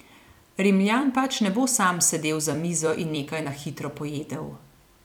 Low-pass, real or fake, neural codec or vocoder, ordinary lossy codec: 19.8 kHz; fake; vocoder, 44.1 kHz, 128 mel bands every 256 samples, BigVGAN v2; none